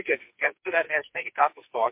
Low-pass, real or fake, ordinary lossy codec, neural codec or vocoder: 3.6 kHz; fake; MP3, 32 kbps; codec, 44.1 kHz, 2.6 kbps, SNAC